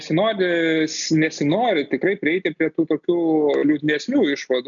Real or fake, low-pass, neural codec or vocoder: real; 7.2 kHz; none